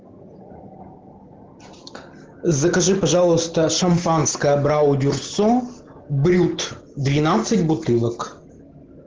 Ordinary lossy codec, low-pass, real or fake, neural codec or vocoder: Opus, 16 kbps; 7.2 kHz; real; none